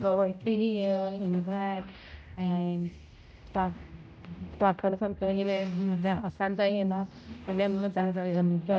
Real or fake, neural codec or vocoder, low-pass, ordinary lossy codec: fake; codec, 16 kHz, 0.5 kbps, X-Codec, HuBERT features, trained on general audio; none; none